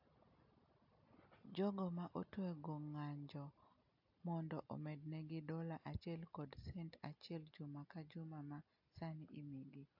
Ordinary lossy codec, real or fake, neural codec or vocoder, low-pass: AAC, 48 kbps; real; none; 5.4 kHz